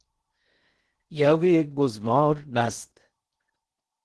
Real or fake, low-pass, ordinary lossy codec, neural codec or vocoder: fake; 10.8 kHz; Opus, 16 kbps; codec, 16 kHz in and 24 kHz out, 0.6 kbps, FocalCodec, streaming, 2048 codes